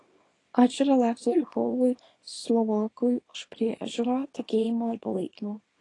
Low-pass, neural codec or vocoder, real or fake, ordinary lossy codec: 10.8 kHz; codec, 24 kHz, 0.9 kbps, WavTokenizer, small release; fake; AAC, 32 kbps